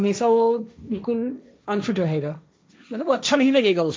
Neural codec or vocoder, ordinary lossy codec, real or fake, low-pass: codec, 16 kHz, 1.1 kbps, Voila-Tokenizer; none; fake; none